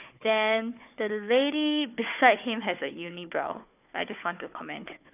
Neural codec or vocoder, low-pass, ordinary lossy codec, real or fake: codec, 16 kHz, 4 kbps, FunCodec, trained on Chinese and English, 50 frames a second; 3.6 kHz; none; fake